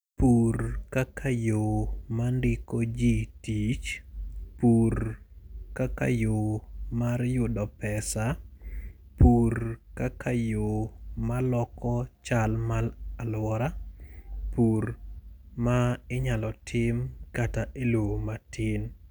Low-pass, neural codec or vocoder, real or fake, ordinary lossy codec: none; none; real; none